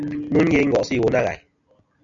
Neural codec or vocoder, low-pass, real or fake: none; 7.2 kHz; real